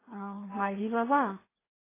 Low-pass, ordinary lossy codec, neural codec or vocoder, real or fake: 3.6 kHz; AAC, 16 kbps; codec, 16 kHz, 2 kbps, FreqCodec, larger model; fake